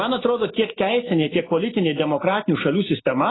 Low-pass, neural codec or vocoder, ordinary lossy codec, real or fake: 7.2 kHz; none; AAC, 16 kbps; real